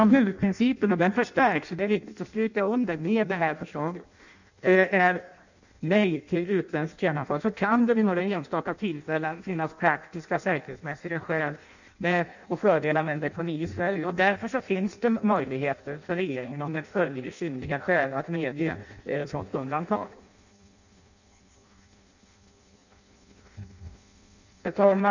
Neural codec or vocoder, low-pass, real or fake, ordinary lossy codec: codec, 16 kHz in and 24 kHz out, 0.6 kbps, FireRedTTS-2 codec; 7.2 kHz; fake; none